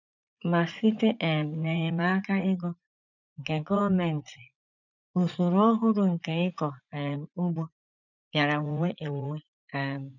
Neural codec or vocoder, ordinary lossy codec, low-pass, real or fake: vocoder, 44.1 kHz, 80 mel bands, Vocos; none; 7.2 kHz; fake